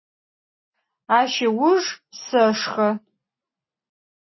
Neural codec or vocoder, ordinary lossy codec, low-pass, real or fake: none; MP3, 24 kbps; 7.2 kHz; real